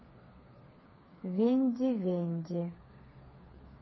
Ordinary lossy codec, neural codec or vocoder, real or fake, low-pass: MP3, 24 kbps; codec, 16 kHz, 4 kbps, FreqCodec, smaller model; fake; 7.2 kHz